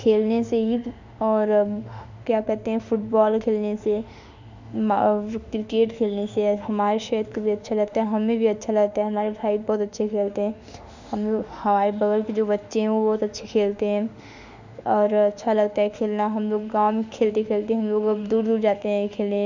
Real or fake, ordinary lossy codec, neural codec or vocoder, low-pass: fake; none; autoencoder, 48 kHz, 32 numbers a frame, DAC-VAE, trained on Japanese speech; 7.2 kHz